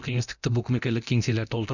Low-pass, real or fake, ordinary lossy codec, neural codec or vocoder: 7.2 kHz; fake; none; codec, 24 kHz, 0.9 kbps, DualCodec